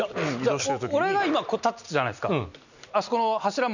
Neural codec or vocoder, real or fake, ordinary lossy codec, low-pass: none; real; none; 7.2 kHz